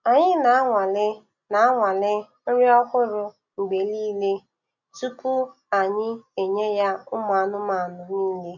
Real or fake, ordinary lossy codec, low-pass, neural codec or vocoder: real; none; 7.2 kHz; none